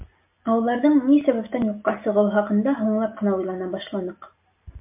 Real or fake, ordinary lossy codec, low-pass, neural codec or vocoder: real; MP3, 32 kbps; 3.6 kHz; none